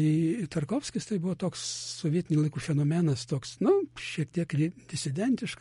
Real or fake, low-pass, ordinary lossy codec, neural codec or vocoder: real; 10.8 kHz; MP3, 48 kbps; none